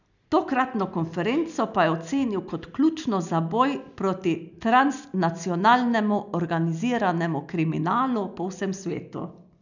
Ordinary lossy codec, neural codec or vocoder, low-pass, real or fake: none; none; 7.2 kHz; real